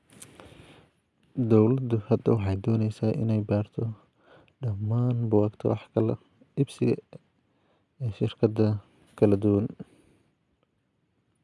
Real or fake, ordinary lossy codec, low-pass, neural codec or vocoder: real; none; none; none